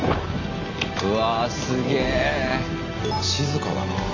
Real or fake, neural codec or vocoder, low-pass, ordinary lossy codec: real; none; 7.2 kHz; AAC, 48 kbps